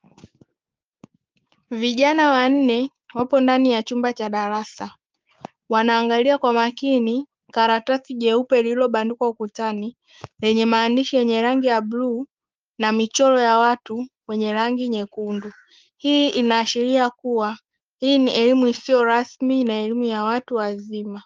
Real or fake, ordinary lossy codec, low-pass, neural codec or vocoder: fake; Opus, 24 kbps; 7.2 kHz; codec, 16 kHz, 6 kbps, DAC